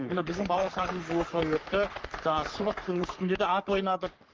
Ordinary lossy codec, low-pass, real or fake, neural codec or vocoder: Opus, 32 kbps; 7.2 kHz; fake; codec, 44.1 kHz, 3.4 kbps, Pupu-Codec